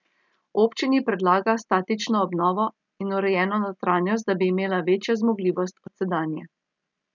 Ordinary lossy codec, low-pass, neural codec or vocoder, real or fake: none; 7.2 kHz; none; real